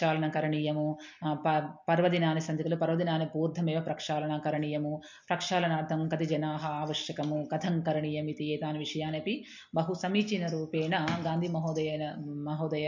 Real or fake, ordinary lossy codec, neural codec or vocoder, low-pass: real; MP3, 48 kbps; none; 7.2 kHz